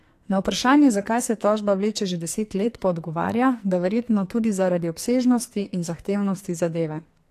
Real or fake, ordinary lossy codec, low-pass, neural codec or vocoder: fake; AAC, 64 kbps; 14.4 kHz; codec, 44.1 kHz, 2.6 kbps, SNAC